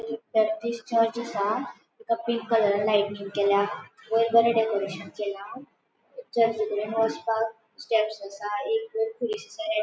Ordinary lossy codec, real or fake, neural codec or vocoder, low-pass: none; real; none; none